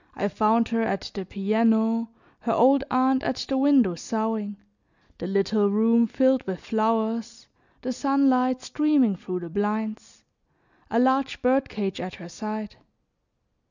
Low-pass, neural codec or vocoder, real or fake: 7.2 kHz; none; real